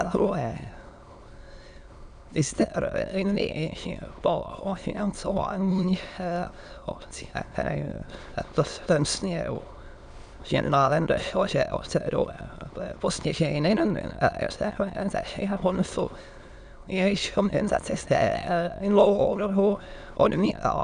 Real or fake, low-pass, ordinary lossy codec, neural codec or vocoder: fake; 9.9 kHz; Opus, 64 kbps; autoencoder, 22.05 kHz, a latent of 192 numbers a frame, VITS, trained on many speakers